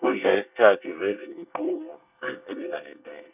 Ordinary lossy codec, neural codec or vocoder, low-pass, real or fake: none; codec, 24 kHz, 1 kbps, SNAC; 3.6 kHz; fake